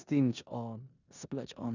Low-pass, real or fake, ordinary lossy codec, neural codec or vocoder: 7.2 kHz; fake; none; codec, 16 kHz in and 24 kHz out, 0.9 kbps, LongCat-Audio-Codec, four codebook decoder